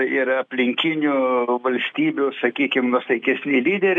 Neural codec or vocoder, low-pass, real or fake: none; 9.9 kHz; real